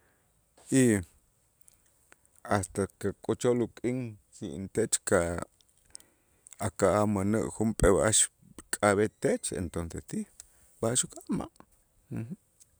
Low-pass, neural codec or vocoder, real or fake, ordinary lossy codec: none; none; real; none